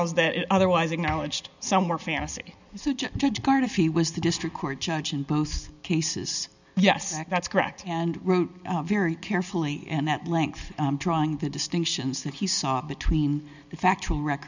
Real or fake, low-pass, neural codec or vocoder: real; 7.2 kHz; none